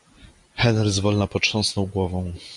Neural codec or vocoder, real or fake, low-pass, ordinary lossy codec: none; real; 10.8 kHz; MP3, 96 kbps